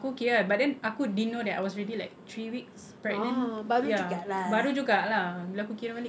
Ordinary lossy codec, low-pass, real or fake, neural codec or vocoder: none; none; real; none